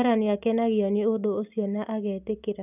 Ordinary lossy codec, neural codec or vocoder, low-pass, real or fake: none; none; 3.6 kHz; real